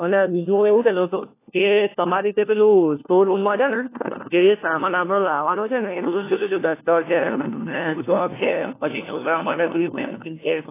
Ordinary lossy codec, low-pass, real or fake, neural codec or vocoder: AAC, 24 kbps; 3.6 kHz; fake; codec, 16 kHz, 1 kbps, FunCodec, trained on LibriTTS, 50 frames a second